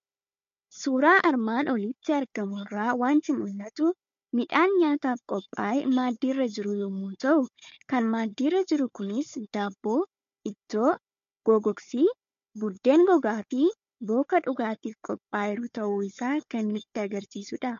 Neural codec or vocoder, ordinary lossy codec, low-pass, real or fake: codec, 16 kHz, 4 kbps, FunCodec, trained on Chinese and English, 50 frames a second; MP3, 48 kbps; 7.2 kHz; fake